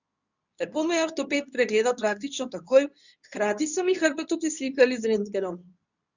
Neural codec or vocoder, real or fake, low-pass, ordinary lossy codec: codec, 24 kHz, 0.9 kbps, WavTokenizer, medium speech release version 2; fake; 7.2 kHz; none